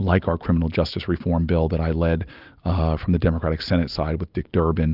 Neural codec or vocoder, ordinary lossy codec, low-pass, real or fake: none; Opus, 32 kbps; 5.4 kHz; real